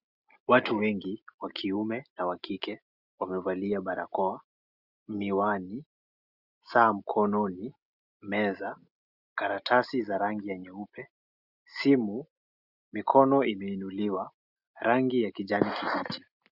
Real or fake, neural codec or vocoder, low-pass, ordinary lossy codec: real; none; 5.4 kHz; Opus, 64 kbps